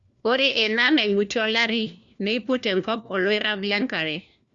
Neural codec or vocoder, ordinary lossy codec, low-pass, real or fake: codec, 16 kHz, 1 kbps, FunCodec, trained on LibriTTS, 50 frames a second; Opus, 64 kbps; 7.2 kHz; fake